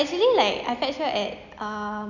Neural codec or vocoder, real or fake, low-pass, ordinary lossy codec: none; real; 7.2 kHz; none